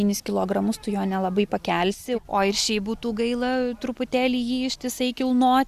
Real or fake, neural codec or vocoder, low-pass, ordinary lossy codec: real; none; 14.4 kHz; Opus, 64 kbps